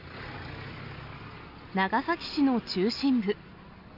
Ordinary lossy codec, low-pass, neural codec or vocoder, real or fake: none; 5.4 kHz; none; real